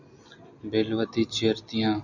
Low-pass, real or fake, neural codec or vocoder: 7.2 kHz; real; none